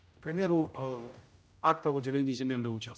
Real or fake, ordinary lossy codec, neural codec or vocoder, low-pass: fake; none; codec, 16 kHz, 0.5 kbps, X-Codec, HuBERT features, trained on general audio; none